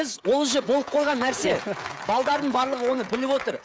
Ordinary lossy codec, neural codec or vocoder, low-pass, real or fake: none; codec, 16 kHz, 16 kbps, FreqCodec, smaller model; none; fake